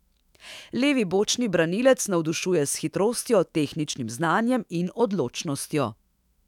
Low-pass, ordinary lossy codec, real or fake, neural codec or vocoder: 19.8 kHz; none; fake; autoencoder, 48 kHz, 128 numbers a frame, DAC-VAE, trained on Japanese speech